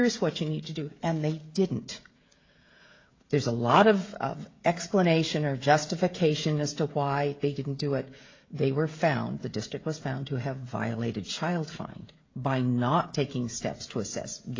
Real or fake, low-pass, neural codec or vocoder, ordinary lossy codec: fake; 7.2 kHz; codec, 16 kHz, 16 kbps, FreqCodec, smaller model; AAC, 32 kbps